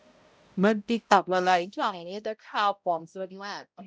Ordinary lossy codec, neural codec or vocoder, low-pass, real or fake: none; codec, 16 kHz, 0.5 kbps, X-Codec, HuBERT features, trained on balanced general audio; none; fake